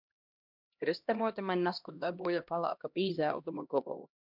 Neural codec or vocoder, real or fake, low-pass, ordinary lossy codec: codec, 16 kHz, 1 kbps, X-Codec, HuBERT features, trained on LibriSpeech; fake; 5.4 kHz; MP3, 48 kbps